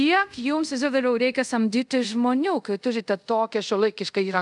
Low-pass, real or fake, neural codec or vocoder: 10.8 kHz; fake; codec, 24 kHz, 0.5 kbps, DualCodec